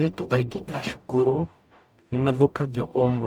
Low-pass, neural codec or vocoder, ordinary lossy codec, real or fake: none; codec, 44.1 kHz, 0.9 kbps, DAC; none; fake